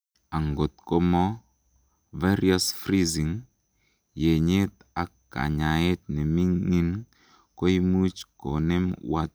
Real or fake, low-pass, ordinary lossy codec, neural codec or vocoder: real; none; none; none